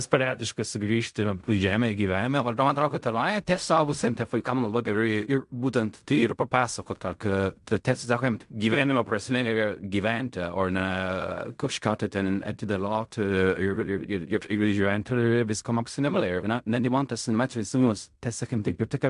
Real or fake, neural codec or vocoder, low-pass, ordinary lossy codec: fake; codec, 16 kHz in and 24 kHz out, 0.4 kbps, LongCat-Audio-Codec, fine tuned four codebook decoder; 10.8 kHz; MP3, 64 kbps